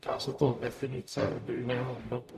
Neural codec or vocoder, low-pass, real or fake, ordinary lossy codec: codec, 44.1 kHz, 0.9 kbps, DAC; 14.4 kHz; fake; MP3, 96 kbps